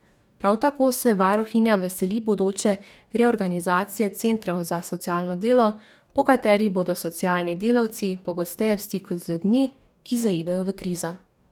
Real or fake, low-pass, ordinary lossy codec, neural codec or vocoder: fake; 19.8 kHz; none; codec, 44.1 kHz, 2.6 kbps, DAC